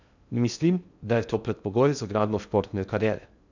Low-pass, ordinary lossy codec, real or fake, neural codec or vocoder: 7.2 kHz; none; fake; codec, 16 kHz in and 24 kHz out, 0.6 kbps, FocalCodec, streaming, 2048 codes